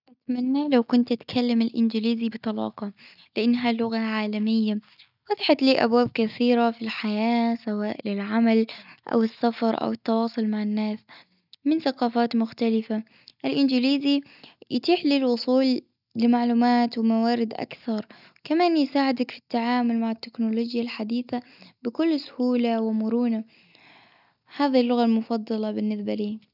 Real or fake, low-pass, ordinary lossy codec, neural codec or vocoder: real; 5.4 kHz; none; none